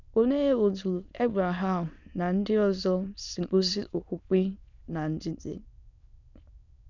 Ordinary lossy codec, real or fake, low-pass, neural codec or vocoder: none; fake; 7.2 kHz; autoencoder, 22.05 kHz, a latent of 192 numbers a frame, VITS, trained on many speakers